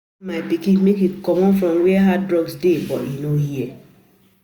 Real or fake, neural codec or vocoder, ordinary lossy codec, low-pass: real; none; none; none